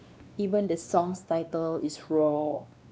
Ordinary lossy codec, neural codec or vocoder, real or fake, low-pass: none; codec, 16 kHz, 2 kbps, X-Codec, WavLM features, trained on Multilingual LibriSpeech; fake; none